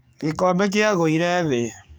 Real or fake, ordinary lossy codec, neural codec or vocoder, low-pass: fake; none; codec, 44.1 kHz, 7.8 kbps, DAC; none